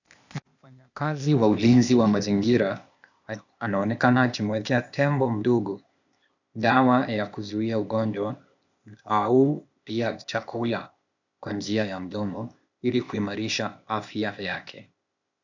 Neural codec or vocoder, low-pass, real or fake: codec, 16 kHz, 0.8 kbps, ZipCodec; 7.2 kHz; fake